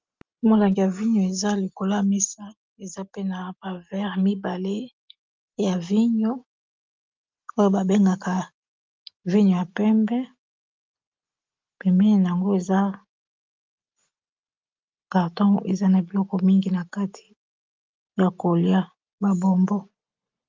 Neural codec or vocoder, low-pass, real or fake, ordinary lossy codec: none; 7.2 kHz; real; Opus, 24 kbps